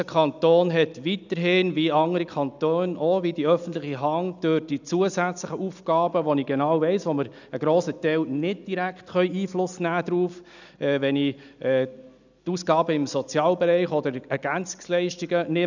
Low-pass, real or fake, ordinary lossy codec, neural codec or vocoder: 7.2 kHz; real; none; none